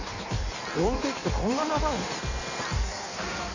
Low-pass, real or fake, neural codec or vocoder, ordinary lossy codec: 7.2 kHz; fake; codec, 16 kHz in and 24 kHz out, 1.1 kbps, FireRedTTS-2 codec; none